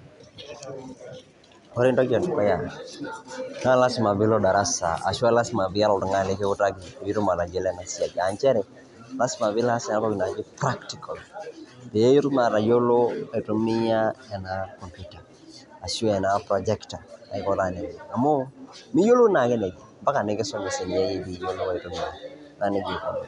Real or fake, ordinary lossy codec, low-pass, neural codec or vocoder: real; none; 10.8 kHz; none